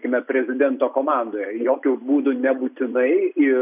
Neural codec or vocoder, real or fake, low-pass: none; real; 3.6 kHz